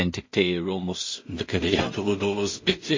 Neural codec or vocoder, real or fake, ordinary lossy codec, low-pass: codec, 16 kHz in and 24 kHz out, 0.4 kbps, LongCat-Audio-Codec, two codebook decoder; fake; MP3, 32 kbps; 7.2 kHz